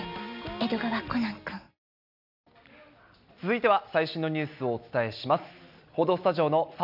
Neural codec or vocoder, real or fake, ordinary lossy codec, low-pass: none; real; none; 5.4 kHz